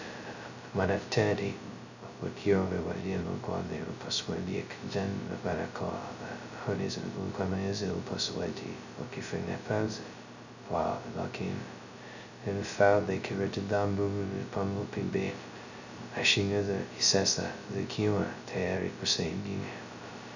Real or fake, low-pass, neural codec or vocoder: fake; 7.2 kHz; codec, 16 kHz, 0.2 kbps, FocalCodec